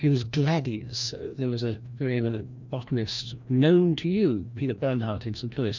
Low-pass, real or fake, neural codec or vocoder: 7.2 kHz; fake; codec, 16 kHz, 1 kbps, FreqCodec, larger model